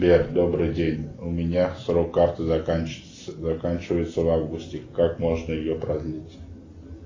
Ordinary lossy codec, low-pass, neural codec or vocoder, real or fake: AAC, 32 kbps; 7.2 kHz; none; real